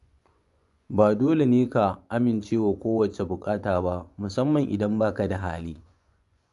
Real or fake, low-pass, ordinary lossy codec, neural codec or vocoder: fake; 10.8 kHz; none; vocoder, 24 kHz, 100 mel bands, Vocos